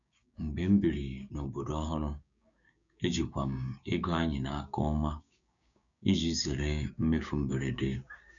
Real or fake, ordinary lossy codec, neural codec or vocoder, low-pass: fake; none; codec, 16 kHz, 6 kbps, DAC; 7.2 kHz